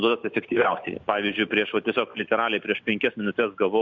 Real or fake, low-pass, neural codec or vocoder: real; 7.2 kHz; none